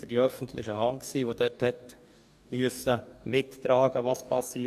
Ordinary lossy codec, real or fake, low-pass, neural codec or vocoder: AAC, 96 kbps; fake; 14.4 kHz; codec, 44.1 kHz, 2.6 kbps, DAC